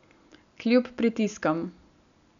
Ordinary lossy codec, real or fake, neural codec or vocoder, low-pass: none; real; none; 7.2 kHz